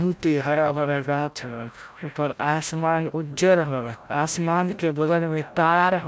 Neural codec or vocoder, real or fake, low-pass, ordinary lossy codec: codec, 16 kHz, 0.5 kbps, FreqCodec, larger model; fake; none; none